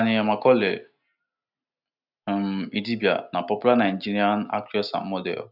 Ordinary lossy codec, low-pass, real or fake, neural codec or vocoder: none; 5.4 kHz; real; none